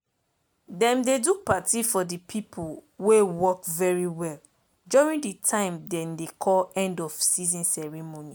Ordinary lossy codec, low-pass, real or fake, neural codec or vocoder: none; none; real; none